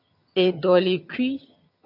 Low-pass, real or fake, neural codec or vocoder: 5.4 kHz; fake; vocoder, 22.05 kHz, 80 mel bands, HiFi-GAN